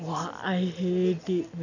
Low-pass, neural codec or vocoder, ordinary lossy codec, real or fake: 7.2 kHz; none; none; real